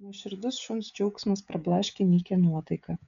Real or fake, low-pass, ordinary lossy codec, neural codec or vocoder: fake; 7.2 kHz; MP3, 64 kbps; codec, 16 kHz, 16 kbps, FreqCodec, smaller model